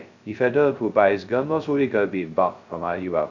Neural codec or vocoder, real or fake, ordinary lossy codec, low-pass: codec, 16 kHz, 0.2 kbps, FocalCodec; fake; none; 7.2 kHz